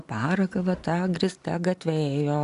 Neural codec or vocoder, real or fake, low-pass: none; real; 10.8 kHz